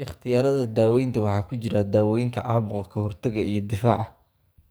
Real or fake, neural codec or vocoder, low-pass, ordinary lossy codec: fake; codec, 44.1 kHz, 2.6 kbps, SNAC; none; none